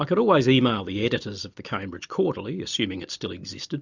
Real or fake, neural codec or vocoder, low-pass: real; none; 7.2 kHz